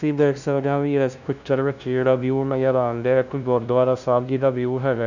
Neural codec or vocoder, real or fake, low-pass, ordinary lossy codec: codec, 16 kHz, 0.5 kbps, FunCodec, trained on LibriTTS, 25 frames a second; fake; 7.2 kHz; none